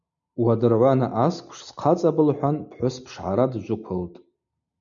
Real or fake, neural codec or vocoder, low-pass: real; none; 7.2 kHz